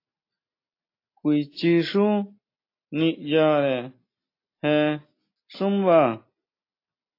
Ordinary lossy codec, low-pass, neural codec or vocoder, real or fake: AAC, 32 kbps; 5.4 kHz; none; real